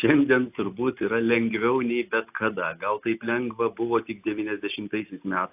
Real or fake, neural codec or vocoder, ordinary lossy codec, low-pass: real; none; AAC, 32 kbps; 3.6 kHz